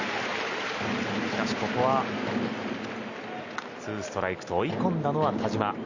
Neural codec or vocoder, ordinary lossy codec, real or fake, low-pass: none; Opus, 64 kbps; real; 7.2 kHz